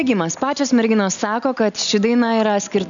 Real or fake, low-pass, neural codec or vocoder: real; 7.2 kHz; none